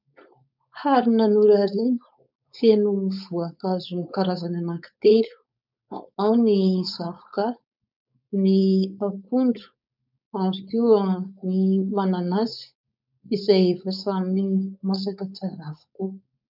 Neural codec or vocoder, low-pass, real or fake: codec, 16 kHz, 4.8 kbps, FACodec; 5.4 kHz; fake